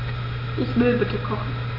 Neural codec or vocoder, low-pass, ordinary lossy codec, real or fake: none; 5.4 kHz; none; real